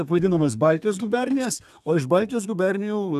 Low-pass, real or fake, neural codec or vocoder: 14.4 kHz; fake; codec, 32 kHz, 1.9 kbps, SNAC